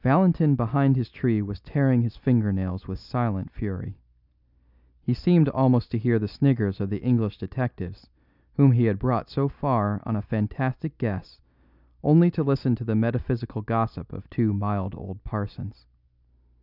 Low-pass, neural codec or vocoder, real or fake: 5.4 kHz; none; real